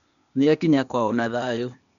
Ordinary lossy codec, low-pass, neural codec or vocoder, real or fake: none; 7.2 kHz; codec, 16 kHz, 0.8 kbps, ZipCodec; fake